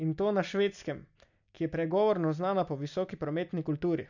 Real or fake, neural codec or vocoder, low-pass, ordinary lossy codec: real; none; 7.2 kHz; none